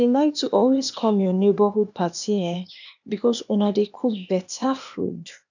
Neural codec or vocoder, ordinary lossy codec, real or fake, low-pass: codec, 16 kHz, 0.8 kbps, ZipCodec; none; fake; 7.2 kHz